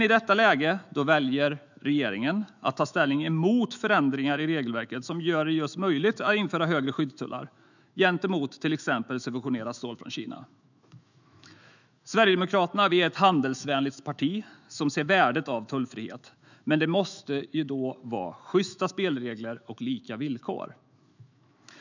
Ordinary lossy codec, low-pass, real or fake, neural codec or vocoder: none; 7.2 kHz; fake; autoencoder, 48 kHz, 128 numbers a frame, DAC-VAE, trained on Japanese speech